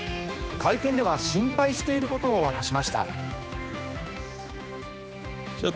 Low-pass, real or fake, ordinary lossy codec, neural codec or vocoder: none; fake; none; codec, 16 kHz, 2 kbps, X-Codec, HuBERT features, trained on general audio